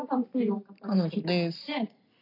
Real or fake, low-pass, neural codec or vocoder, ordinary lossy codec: real; 5.4 kHz; none; AAC, 32 kbps